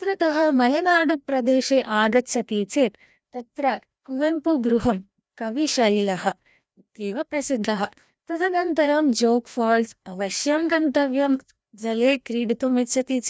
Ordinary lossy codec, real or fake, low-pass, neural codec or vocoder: none; fake; none; codec, 16 kHz, 1 kbps, FreqCodec, larger model